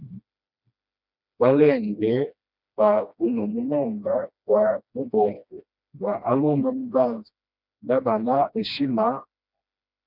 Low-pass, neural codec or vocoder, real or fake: 5.4 kHz; codec, 16 kHz, 1 kbps, FreqCodec, smaller model; fake